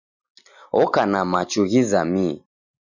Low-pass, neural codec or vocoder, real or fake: 7.2 kHz; none; real